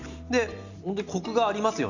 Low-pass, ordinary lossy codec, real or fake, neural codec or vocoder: 7.2 kHz; Opus, 64 kbps; real; none